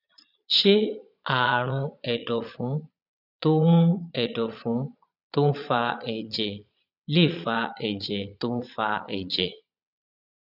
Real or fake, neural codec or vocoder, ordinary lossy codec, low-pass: fake; vocoder, 22.05 kHz, 80 mel bands, Vocos; none; 5.4 kHz